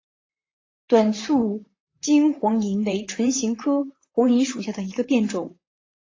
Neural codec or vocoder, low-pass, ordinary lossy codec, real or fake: vocoder, 44.1 kHz, 128 mel bands, Pupu-Vocoder; 7.2 kHz; AAC, 32 kbps; fake